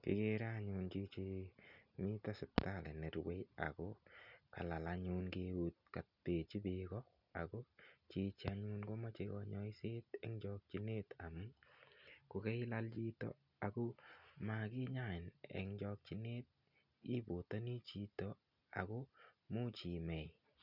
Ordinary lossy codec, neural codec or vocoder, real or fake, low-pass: none; none; real; 5.4 kHz